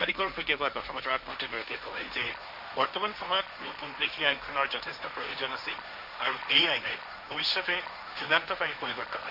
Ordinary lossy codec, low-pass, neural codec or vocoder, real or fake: none; 5.4 kHz; codec, 16 kHz, 1.1 kbps, Voila-Tokenizer; fake